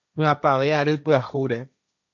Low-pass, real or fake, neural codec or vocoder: 7.2 kHz; fake; codec, 16 kHz, 1.1 kbps, Voila-Tokenizer